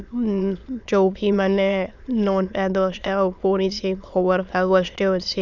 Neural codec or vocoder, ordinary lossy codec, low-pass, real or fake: autoencoder, 22.05 kHz, a latent of 192 numbers a frame, VITS, trained on many speakers; none; 7.2 kHz; fake